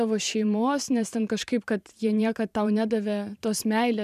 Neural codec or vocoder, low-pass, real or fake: vocoder, 44.1 kHz, 128 mel bands every 512 samples, BigVGAN v2; 14.4 kHz; fake